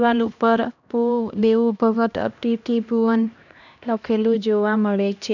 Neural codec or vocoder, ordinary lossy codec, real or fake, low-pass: codec, 16 kHz, 1 kbps, X-Codec, HuBERT features, trained on LibriSpeech; none; fake; 7.2 kHz